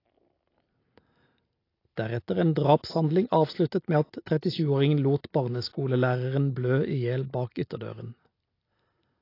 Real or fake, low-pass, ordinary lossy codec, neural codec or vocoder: real; 5.4 kHz; AAC, 32 kbps; none